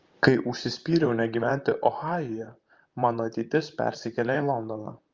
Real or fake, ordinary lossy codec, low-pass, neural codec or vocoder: fake; Opus, 32 kbps; 7.2 kHz; vocoder, 44.1 kHz, 128 mel bands, Pupu-Vocoder